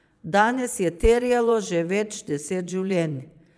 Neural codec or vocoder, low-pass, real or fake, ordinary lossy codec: vocoder, 22.05 kHz, 80 mel bands, Vocos; none; fake; none